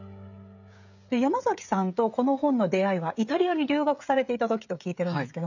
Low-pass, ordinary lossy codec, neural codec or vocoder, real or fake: 7.2 kHz; none; codec, 16 kHz, 16 kbps, FreqCodec, smaller model; fake